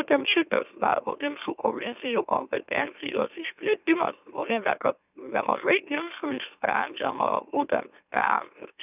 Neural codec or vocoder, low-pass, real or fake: autoencoder, 44.1 kHz, a latent of 192 numbers a frame, MeloTTS; 3.6 kHz; fake